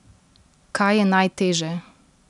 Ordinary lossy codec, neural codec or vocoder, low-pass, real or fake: none; none; 10.8 kHz; real